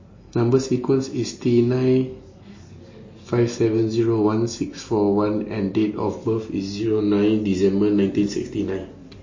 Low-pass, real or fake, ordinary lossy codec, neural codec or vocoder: 7.2 kHz; real; MP3, 32 kbps; none